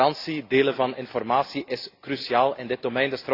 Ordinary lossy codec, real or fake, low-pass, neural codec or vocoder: AAC, 32 kbps; real; 5.4 kHz; none